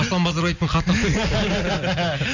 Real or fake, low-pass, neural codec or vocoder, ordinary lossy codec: real; 7.2 kHz; none; none